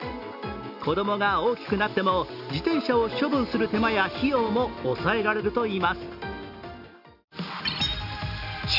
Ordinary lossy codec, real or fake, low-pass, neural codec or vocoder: none; real; 5.4 kHz; none